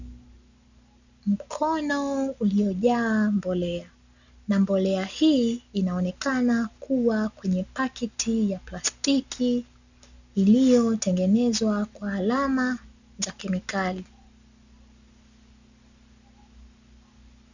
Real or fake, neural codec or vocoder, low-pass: real; none; 7.2 kHz